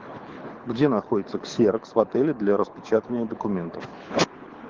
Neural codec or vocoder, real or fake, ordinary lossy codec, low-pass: codec, 24 kHz, 6 kbps, HILCodec; fake; Opus, 16 kbps; 7.2 kHz